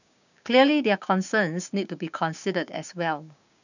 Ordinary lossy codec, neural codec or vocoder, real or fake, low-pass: none; codec, 16 kHz, 6 kbps, DAC; fake; 7.2 kHz